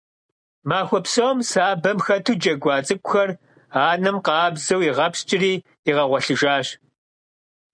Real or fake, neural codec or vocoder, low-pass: real; none; 9.9 kHz